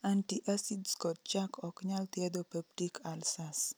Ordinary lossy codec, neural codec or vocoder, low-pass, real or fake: none; none; none; real